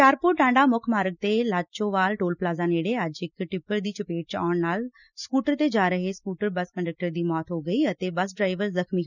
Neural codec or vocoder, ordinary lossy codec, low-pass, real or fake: none; none; 7.2 kHz; real